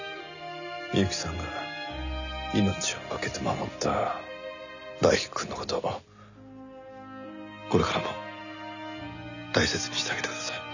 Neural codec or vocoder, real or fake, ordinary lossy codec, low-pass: none; real; none; 7.2 kHz